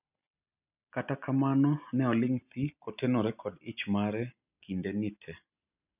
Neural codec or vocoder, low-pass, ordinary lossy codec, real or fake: none; 3.6 kHz; none; real